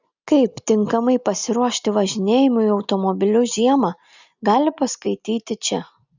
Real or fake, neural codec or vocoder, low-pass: real; none; 7.2 kHz